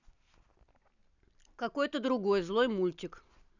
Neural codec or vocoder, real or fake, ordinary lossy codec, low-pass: none; real; none; 7.2 kHz